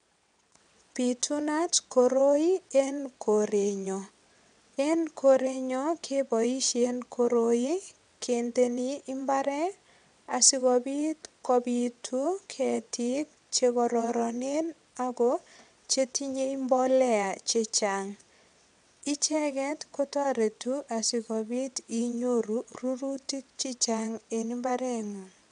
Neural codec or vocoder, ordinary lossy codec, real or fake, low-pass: vocoder, 22.05 kHz, 80 mel bands, WaveNeXt; none; fake; 9.9 kHz